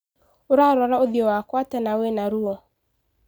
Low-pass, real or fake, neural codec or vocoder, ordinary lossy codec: none; real; none; none